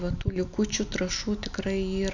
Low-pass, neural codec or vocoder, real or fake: 7.2 kHz; none; real